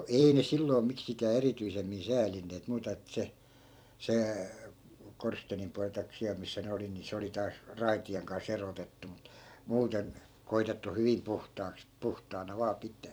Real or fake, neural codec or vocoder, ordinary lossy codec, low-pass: fake; vocoder, 44.1 kHz, 128 mel bands every 256 samples, BigVGAN v2; none; none